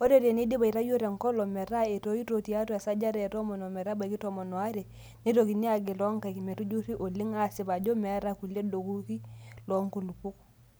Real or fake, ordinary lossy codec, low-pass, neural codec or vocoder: real; none; none; none